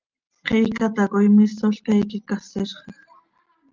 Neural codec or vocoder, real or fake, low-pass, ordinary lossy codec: none; real; 7.2 kHz; Opus, 24 kbps